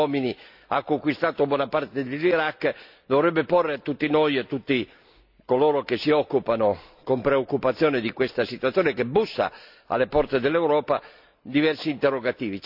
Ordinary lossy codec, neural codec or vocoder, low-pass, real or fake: none; none; 5.4 kHz; real